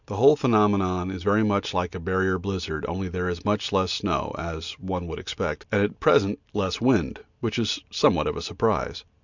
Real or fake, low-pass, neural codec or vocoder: real; 7.2 kHz; none